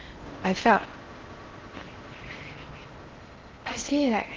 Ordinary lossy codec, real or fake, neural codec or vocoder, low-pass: Opus, 16 kbps; fake; codec, 16 kHz in and 24 kHz out, 0.6 kbps, FocalCodec, streaming, 4096 codes; 7.2 kHz